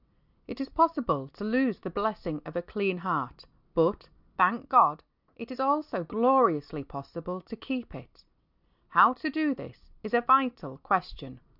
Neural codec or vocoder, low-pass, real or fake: none; 5.4 kHz; real